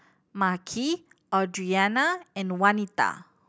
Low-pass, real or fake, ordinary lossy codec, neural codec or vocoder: none; real; none; none